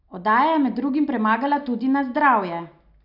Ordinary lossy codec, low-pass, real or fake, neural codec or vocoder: none; 5.4 kHz; real; none